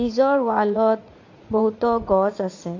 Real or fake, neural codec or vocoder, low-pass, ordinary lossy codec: fake; vocoder, 44.1 kHz, 80 mel bands, Vocos; 7.2 kHz; AAC, 48 kbps